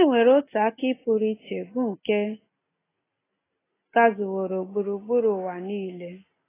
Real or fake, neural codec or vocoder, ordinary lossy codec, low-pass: real; none; AAC, 16 kbps; 3.6 kHz